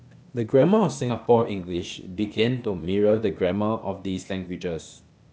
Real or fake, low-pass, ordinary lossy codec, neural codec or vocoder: fake; none; none; codec, 16 kHz, 0.8 kbps, ZipCodec